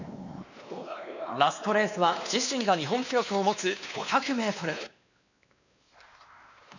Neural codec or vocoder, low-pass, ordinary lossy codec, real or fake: codec, 16 kHz, 2 kbps, X-Codec, WavLM features, trained on Multilingual LibriSpeech; 7.2 kHz; none; fake